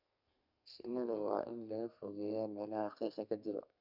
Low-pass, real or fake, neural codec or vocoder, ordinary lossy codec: 5.4 kHz; fake; codec, 32 kHz, 1.9 kbps, SNAC; none